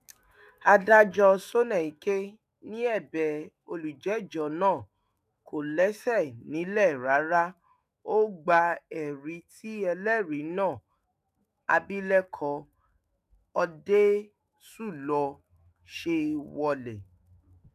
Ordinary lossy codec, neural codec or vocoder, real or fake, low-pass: none; autoencoder, 48 kHz, 128 numbers a frame, DAC-VAE, trained on Japanese speech; fake; 14.4 kHz